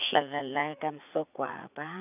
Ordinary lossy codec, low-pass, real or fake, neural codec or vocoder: none; 3.6 kHz; fake; vocoder, 44.1 kHz, 80 mel bands, Vocos